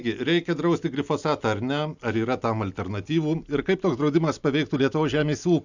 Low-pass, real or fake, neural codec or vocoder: 7.2 kHz; real; none